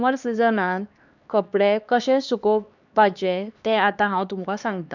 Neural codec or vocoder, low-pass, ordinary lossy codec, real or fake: codec, 16 kHz, 2 kbps, X-Codec, HuBERT features, trained on LibriSpeech; 7.2 kHz; none; fake